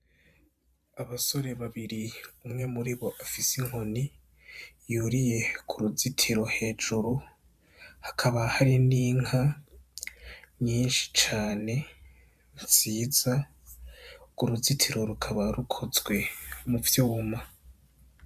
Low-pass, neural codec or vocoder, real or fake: 14.4 kHz; vocoder, 44.1 kHz, 128 mel bands every 512 samples, BigVGAN v2; fake